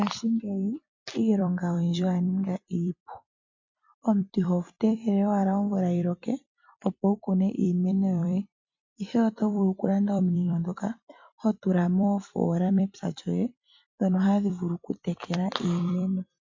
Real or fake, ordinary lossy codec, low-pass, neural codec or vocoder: fake; MP3, 48 kbps; 7.2 kHz; vocoder, 44.1 kHz, 128 mel bands every 256 samples, BigVGAN v2